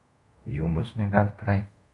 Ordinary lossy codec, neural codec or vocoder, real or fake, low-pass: MP3, 64 kbps; codec, 24 kHz, 0.5 kbps, DualCodec; fake; 10.8 kHz